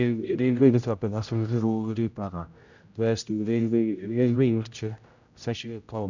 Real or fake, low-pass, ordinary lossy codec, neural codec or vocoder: fake; 7.2 kHz; none; codec, 16 kHz, 0.5 kbps, X-Codec, HuBERT features, trained on general audio